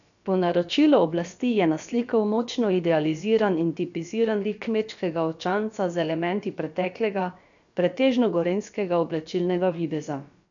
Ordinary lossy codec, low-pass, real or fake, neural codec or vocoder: none; 7.2 kHz; fake; codec, 16 kHz, about 1 kbps, DyCAST, with the encoder's durations